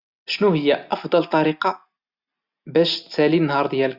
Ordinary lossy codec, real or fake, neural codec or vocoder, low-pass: Opus, 64 kbps; real; none; 5.4 kHz